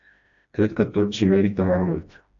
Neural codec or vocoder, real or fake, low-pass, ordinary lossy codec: codec, 16 kHz, 1 kbps, FreqCodec, smaller model; fake; 7.2 kHz; AAC, 64 kbps